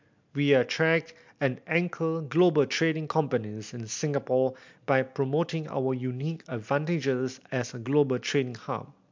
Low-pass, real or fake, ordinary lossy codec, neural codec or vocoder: 7.2 kHz; real; MP3, 64 kbps; none